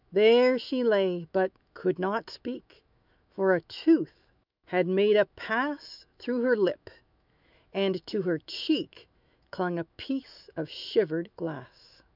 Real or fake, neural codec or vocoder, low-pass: fake; autoencoder, 48 kHz, 128 numbers a frame, DAC-VAE, trained on Japanese speech; 5.4 kHz